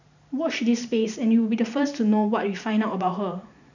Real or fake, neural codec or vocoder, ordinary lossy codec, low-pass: fake; vocoder, 44.1 kHz, 128 mel bands every 512 samples, BigVGAN v2; none; 7.2 kHz